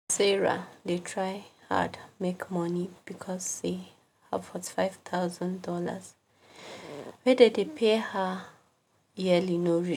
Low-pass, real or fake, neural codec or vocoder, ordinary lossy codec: 19.8 kHz; real; none; none